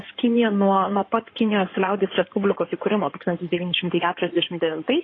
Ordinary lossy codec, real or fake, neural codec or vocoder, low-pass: AAC, 32 kbps; fake; codec, 16 kHz in and 24 kHz out, 2.2 kbps, FireRedTTS-2 codec; 9.9 kHz